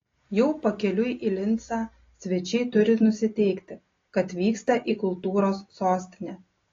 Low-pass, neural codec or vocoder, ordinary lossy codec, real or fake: 7.2 kHz; none; AAC, 32 kbps; real